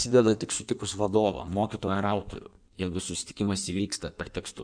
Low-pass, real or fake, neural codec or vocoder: 9.9 kHz; fake; codec, 16 kHz in and 24 kHz out, 1.1 kbps, FireRedTTS-2 codec